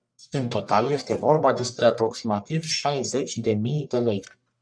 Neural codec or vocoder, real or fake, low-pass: codec, 44.1 kHz, 1.7 kbps, Pupu-Codec; fake; 9.9 kHz